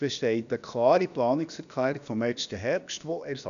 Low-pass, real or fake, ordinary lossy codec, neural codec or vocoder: 7.2 kHz; fake; none; codec, 16 kHz, about 1 kbps, DyCAST, with the encoder's durations